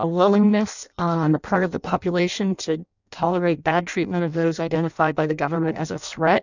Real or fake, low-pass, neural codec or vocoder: fake; 7.2 kHz; codec, 16 kHz in and 24 kHz out, 0.6 kbps, FireRedTTS-2 codec